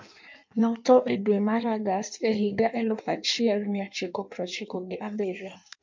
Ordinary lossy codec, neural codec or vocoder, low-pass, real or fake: MP3, 64 kbps; codec, 16 kHz in and 24 kHz out, 1.1 kbps, FireRedTTS-2 codec; 7.2 kHz; fake